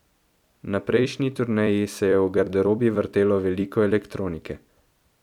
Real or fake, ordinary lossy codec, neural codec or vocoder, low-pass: fake; none; vocoder, 44.1 kHz, 128 mel bands every 256 samples, BigVGAN v2; 19.8 kHz